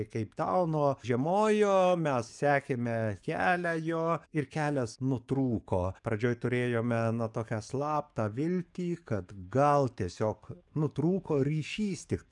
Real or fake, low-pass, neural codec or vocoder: fake; 10.8 kHz; codec, 44.1 kHz, 7.8 kbps, DAC